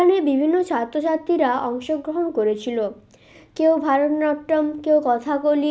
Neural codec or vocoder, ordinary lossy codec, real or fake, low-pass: none; none; real; none